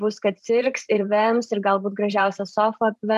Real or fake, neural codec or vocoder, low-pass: fake; vocoder, 44.1 kHz, 128 mel bands every 512 samples, BigVGAN v2; 14.4 kHz